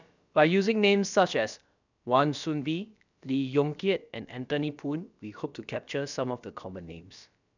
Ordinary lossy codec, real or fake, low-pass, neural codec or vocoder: none; fake; 7.2 kHz; codec, 16 kHz, about 1 kbps, DyCAST, with the encoder's durations